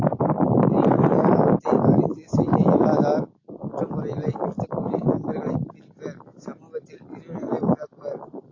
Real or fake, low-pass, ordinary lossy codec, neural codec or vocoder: real; 7.2 kHz; AAC, 32 kbps; none